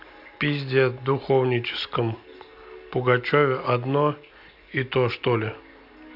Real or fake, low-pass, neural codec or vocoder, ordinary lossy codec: real; 5.4 kHz; none; AAC, 48 kbps